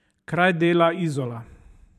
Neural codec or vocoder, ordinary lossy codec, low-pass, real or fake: vocoder, 44.1 kHz, 128 mel bands every 512 samples, BigVGAN v2; none; 14.4 kHz; fake